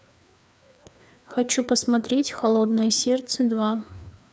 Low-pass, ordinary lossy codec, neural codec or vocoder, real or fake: none; none; codec, 16 kHz, 2 kbps, FreqCodec, larger model; fake